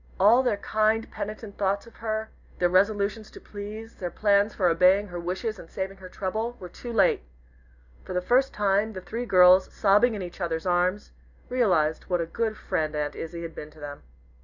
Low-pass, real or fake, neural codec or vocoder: 7.2 kHz; real; none